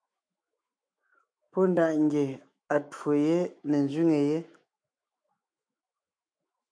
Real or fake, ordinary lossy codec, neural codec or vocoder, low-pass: fake; AAC, 64 kbps; autoencoder, 48 kHz, 128 numbers a frame, DAC-VAE, trained on Japanese speech; 9.9 kHz